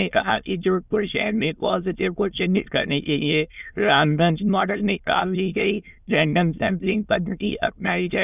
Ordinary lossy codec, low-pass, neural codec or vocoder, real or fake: none; 3.6 kHz; autoencoder, 22.05 kHz, a latent of 192 numbers a frame, VITS, trained on many speakers; fake